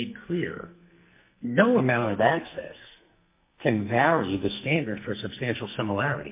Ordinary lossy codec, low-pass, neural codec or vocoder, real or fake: MP3, 24 kbps; 3.6 kHz; codec, 44.1 kHz, 2.6 kbps, SNAC; fake